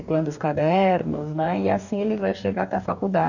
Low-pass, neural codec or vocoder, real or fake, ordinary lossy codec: 7.2 kHz; codec, 44.1 kHz, 2.6 kbps, DAC; fake; none